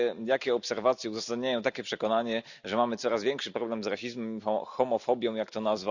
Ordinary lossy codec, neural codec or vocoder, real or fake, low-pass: none; none; real; 7.2 kHz